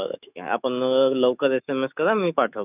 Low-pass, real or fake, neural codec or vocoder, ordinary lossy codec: 3.6 kHz; fake; autoencoder, 48 kHz, 128 numbers a frame, DAC-VAE, trained on Japanese speech; none